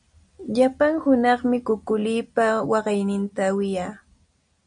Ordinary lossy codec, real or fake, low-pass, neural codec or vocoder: MP3, 96 kbps; real; 9.9 kHz; none